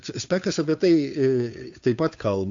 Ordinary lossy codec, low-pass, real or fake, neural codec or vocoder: AAC, 64 kbps; 7.2 kHz; fake; codec, 16 kHz, 2 kbps, FunCodec, trained on Chinese and English, 25 frames a second